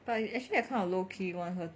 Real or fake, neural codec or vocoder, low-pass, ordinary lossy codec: real; none; none; none